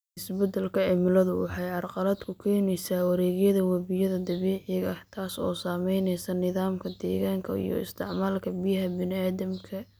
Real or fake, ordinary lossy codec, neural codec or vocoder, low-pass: real; none; none; none